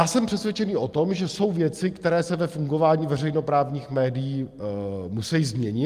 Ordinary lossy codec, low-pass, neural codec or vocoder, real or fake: Opus, 32 kbps; 14.4 kHz; none; real